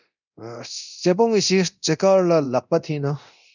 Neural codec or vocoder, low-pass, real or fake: codec, 24 kHz, 0.9 kbps, DualCodec; 7.2 kHz; fake